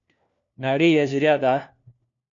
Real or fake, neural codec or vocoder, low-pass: fake; codec, 16 kHz, 1 kbps, FunCodec, trained on LibriTTS, 50 frames a second; 7.2 kHz